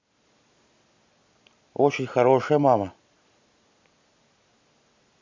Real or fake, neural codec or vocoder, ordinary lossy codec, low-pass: real; none; MP3, 64 kbps; 7.2 kHz